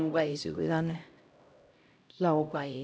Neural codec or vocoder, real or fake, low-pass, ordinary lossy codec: codec, 16 kHz, 0.5 kbps, X-Codec, HuBERT features, trained on LibriSpeech; fake; none; none